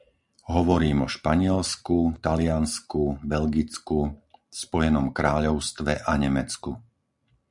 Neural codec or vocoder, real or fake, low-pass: none; real; 10.8 kHz